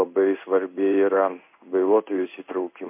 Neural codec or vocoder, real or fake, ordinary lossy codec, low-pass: codec, 16 kHz in and 24 kHz out, 1 kbps, XY-Tokenizer; fake; MP3, 32 kbps; 3.6 kHz